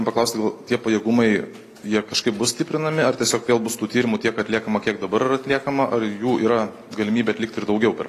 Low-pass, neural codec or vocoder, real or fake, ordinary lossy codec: 14.4 kHz; none; real; AAC, 48 kbps